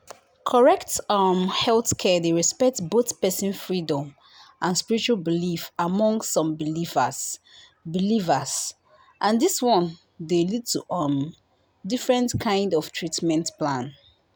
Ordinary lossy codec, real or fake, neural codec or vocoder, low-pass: none; real; none; none